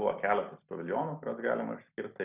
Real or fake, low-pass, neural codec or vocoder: real; 3.6 kHz; none